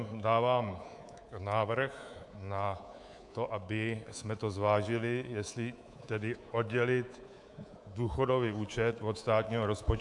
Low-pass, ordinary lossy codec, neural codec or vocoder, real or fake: 10.8 kHz; AAC, 64 kbps; codec, 24 kHz, 3.1 kbps, DualCodec; fake